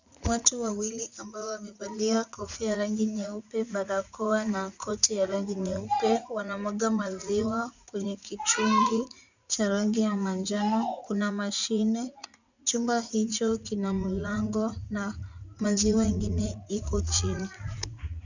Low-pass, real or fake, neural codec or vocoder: 7.2 kHz; fake; vocoder, 44.1 kHz, 80 mel bands, Vocos